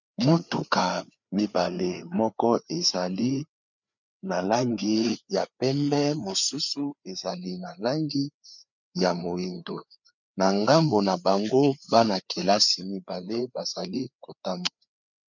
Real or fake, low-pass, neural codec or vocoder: fake; 7.2 kHz; codec, 16 kHz, 4 kbps, FreqCodec, larger model